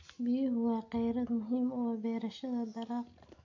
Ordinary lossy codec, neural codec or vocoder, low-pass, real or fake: AAC, 48 kbps; none; 7.2 kHz; real